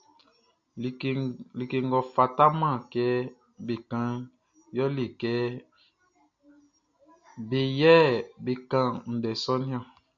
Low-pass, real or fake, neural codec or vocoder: 7.2 kHz; real; none